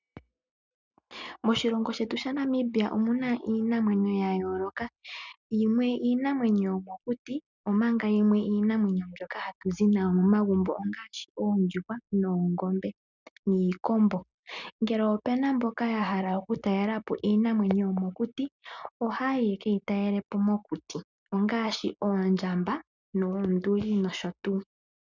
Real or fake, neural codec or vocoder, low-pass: real; none; 7.2 kHz